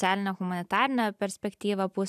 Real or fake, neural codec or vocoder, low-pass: real; none; 14.4 kHz